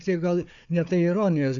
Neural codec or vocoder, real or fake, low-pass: codec, 16 kHz, 4 kbps, FunCodec, trained on Chinese and English, 50 frames a second; fake; 7.2 kHz